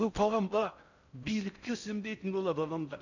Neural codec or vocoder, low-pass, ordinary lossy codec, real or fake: codec, 16 kHz in and 24 kHz out, 0.6 kbps, FocalCodec, streaming, 4096 codes; 7.2 kHz; none; fake